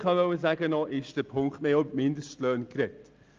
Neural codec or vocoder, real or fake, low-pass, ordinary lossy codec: none; real; 7.2 kHz; Opus, 24 kbps